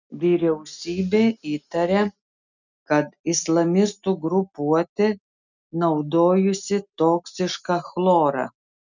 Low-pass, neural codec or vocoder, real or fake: 7.2 kHz; none; real